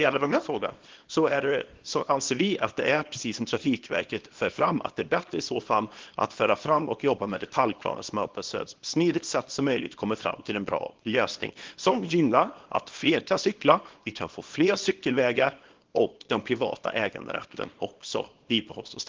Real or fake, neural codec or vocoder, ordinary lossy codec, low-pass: fake; codec, 24 kHz, 0.9 kbps, WavTokenizer, small release; Opus, 16 kbps; 7.2 kHz